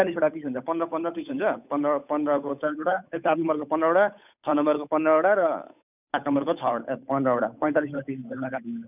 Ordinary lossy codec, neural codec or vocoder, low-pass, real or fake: none; codec, 16 kHz, 8 kbps, FunCodec, trained on Chinese and English, 25 frames a second; 3.6 kHz; fake